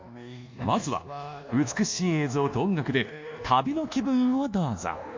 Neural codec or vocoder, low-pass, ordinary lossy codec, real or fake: codec, 24 kHz, 1.2 kbps, DualCodec; 7.2 kHz; MP3, 64 kbps; fake